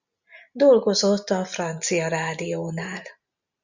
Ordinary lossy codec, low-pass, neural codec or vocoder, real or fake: Opus, 64 kbps; 7.2 kHz; none; real